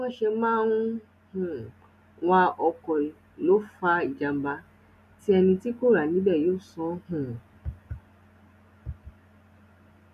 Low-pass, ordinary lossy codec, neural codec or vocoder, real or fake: 14.4 kHz; none; none; real